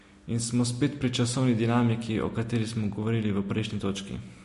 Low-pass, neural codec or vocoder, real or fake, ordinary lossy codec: 14.4 kHz; none; real; MP3, 48 kbps